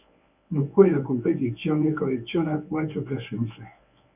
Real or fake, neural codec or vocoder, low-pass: fake; codec, 24 kHz, 0.9 kbps, WavTokenizer, medium speech release version 1; 3.6 kHz